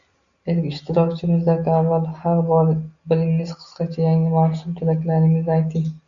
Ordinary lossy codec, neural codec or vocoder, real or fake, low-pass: Opus, 64 kbps; none; real; 7.2 kHz